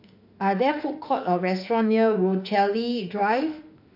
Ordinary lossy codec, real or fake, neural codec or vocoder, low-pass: AAC, 48 kbps; fake; autoencoder, 48 kHz, 32 numbers a frame, DAC-VAE, trained on Japanese speech; 5.4 kHz